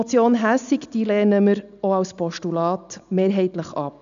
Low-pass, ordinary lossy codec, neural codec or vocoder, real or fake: 7.2 kHz; none; none; real